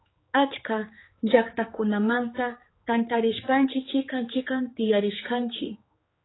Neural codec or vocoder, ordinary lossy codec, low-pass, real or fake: codec, 16 kHz, 4 kbps, X-Codec, HuBERT features, trained on general audio; AAC, 16 kbps; 7.2 kHz; fake